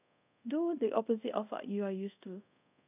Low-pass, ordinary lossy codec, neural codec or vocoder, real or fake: 3.6 kHz; none; codec, 24 kHz, 0.5 kbps, DualCodec; fake